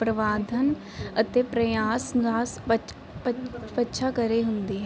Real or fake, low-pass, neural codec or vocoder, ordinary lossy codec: real; none; none; none